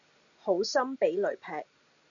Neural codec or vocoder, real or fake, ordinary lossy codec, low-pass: none; real; MP3, 96 kbps; 7.2 kHz